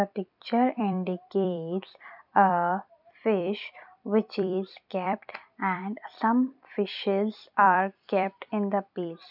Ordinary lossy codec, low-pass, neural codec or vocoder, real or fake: none; 5.4 kHz; vocoder, 44.1 kHz, 128 mel bands every 256 samples, BigVGAN v2; fake